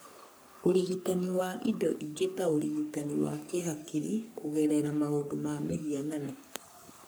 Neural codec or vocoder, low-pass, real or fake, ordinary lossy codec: codec, 44.1 kHz, 3.4 kbps, Pupu-Codec; none; fake; none